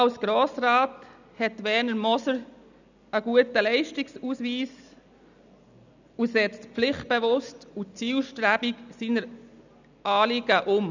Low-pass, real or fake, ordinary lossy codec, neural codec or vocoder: 7.2 kHz; real; none; none